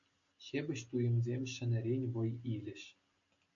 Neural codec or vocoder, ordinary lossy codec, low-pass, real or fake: none; AAC, 64 kbps; 7.2 kHz; real